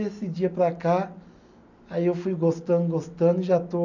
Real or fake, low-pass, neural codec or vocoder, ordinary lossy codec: real; 7.2 kHz; none; none